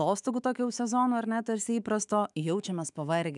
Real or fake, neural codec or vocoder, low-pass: fake; autoencoder, 48 kHz, 128 numbers a frame, DAC-VAE, trained on Japanese speech; 10.8 kHz